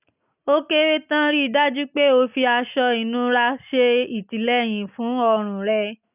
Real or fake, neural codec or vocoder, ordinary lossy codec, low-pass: real; none; none; 3.6 kHz